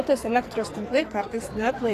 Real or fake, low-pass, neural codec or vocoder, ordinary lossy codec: fake; 14.4 kHz; codec, 44.1 kHz, 2.6 kbps, SNAC; AAC, 48 kbps